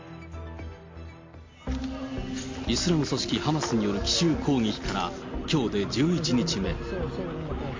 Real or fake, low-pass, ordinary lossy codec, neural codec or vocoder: real; 7.2 kHz; MP3, 48 kbps; none